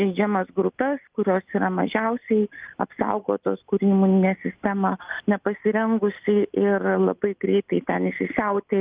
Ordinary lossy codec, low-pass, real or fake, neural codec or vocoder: Opus, 24 kbps; 3.6 kHz; real; none